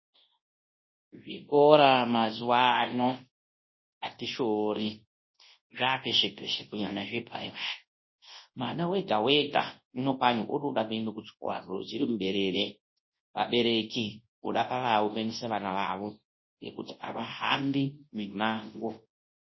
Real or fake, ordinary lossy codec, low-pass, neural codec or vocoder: fake; MP3, 24 kbps; 7.2 kHz; codec, 24 kHz, 0.9 kbps, WavTokenizer, large speech release